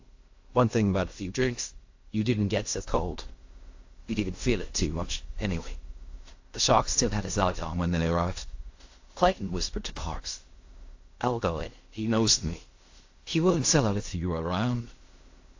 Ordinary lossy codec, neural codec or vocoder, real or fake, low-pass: AAC, 48 kbps; codec, 16 kHz in and 24 kHz out, 0.4 kbps, LongCat-Audio-Codec, fine tuned four codebook decoder; fake; 7.2 kHz